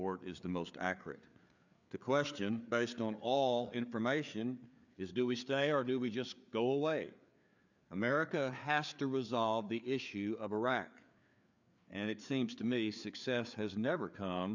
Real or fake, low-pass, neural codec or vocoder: fake; 7.2 kHz; codec, 16 kHz, 4 kbps, FreqCodec, larger model